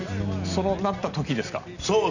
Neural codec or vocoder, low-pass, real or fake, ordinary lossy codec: none; 7.2 kHz; real; none